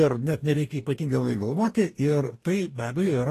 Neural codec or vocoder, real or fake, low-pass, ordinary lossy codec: codec, 44.1 kHz, 2.6 kbps, DAC; fake; 14.4 kHz; AAC, 48 kbps